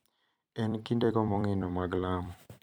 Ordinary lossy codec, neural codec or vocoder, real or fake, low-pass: none; vocoder, 44.1 kHz, 128 mel bands every 256 samples, BigVGAN v2; fake; none